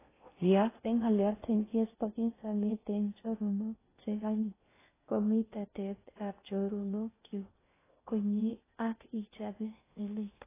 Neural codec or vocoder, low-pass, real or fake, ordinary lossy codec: codec, 16 kHz in and 24 kHz out, 0.6 kbps, FocalCodec, streaming, 2048 codes; 3.6 kHz; fake; AAC, 16 kbps